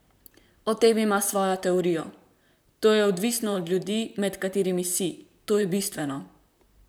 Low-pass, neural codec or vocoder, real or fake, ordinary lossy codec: none; vocoder, 44.1 kHz, 128 mel bands, Pupu-Vocoder; fake; none